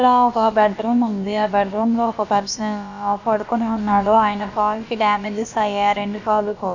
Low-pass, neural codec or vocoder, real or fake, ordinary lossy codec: 7.2 kHz; codec, 16 kHz, about 1 kbps, DyCAST, with the encoder's durations; fake; none